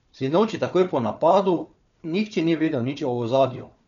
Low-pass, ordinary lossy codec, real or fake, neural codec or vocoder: 7.2 kHz; none; fake; codec, 16 kHz, 4 kbps, FunCodec, trained on Chinese and English, 50 frames a second